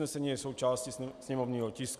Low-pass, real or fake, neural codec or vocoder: 14.4 kHz; real; none